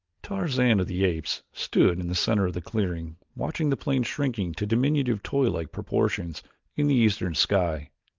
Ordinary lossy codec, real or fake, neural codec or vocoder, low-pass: Opus, 32 kbps; real; none; 7.2 kHz